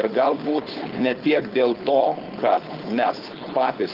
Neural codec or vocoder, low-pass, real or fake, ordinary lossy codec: codec, 16 kHz, 4.8 kbps, FACodec; 5.4 kHz; fake; Opus, 32 kbps